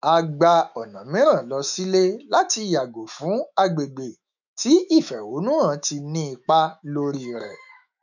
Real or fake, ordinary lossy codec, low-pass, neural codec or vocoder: fake; none; 7.2 kHz; autoencoder, 48 kHz, 128 numbers a frame, DAC-VAE, trained on Japanese speech